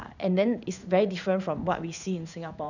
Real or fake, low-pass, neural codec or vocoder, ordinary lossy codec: fake; 7.2 kHz; codec, 16 kHz in and 24 kHz out, 1 kbps, XY-Tokenizer; none